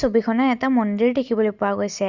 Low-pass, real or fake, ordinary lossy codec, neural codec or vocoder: 7.2 kHz; real; Opus, 64 kbps; none